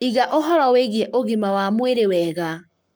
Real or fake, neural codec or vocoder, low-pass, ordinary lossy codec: fake; codec, 44.1 kHz, 7.8 kbps, Pupu-Codec; none; none